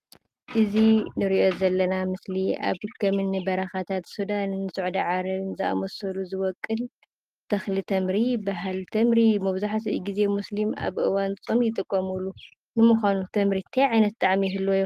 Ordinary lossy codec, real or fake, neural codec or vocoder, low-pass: Opus, 32 kbps; real; none; 14.4 kHz